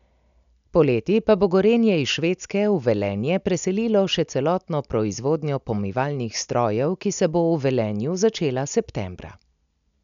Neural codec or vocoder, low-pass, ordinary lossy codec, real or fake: none; 7.2 kHz; none; real